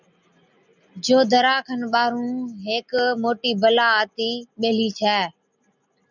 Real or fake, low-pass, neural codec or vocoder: real; 7.2 kHz; none